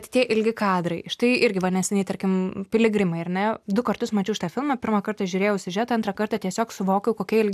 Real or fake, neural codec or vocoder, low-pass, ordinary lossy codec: real; none; 14.4 kHz; AAC, 96 kbps